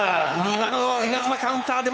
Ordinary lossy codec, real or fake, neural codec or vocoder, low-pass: none; fake; codec, 16 kHz, 4 kbps, X-Codec, WavLM features, trained on Multilingual LibriSpeech; none